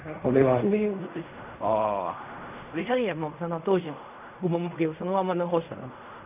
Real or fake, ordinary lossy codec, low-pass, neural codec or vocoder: fake; none; 3.6 kHz; codec, 16 kHz in and 24 kHz out, 0.4 kbps, LongCat-Audio-Codec, fine tuned four codebook decoder